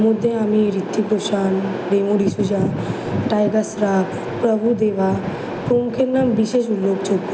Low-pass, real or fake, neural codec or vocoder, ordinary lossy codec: none; real; none; none